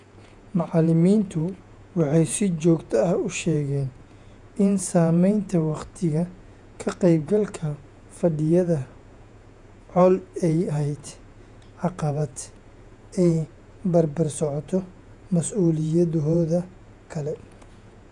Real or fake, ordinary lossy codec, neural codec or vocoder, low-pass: fake; none; vocoder, 48 kHz, 128 mel bands, Vocos; 10.8 kHz